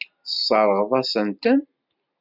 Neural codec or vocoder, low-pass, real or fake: none; 5.4 kHz; real